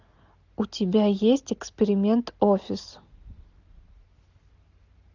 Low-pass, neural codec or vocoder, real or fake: 7.2 kHz; none; real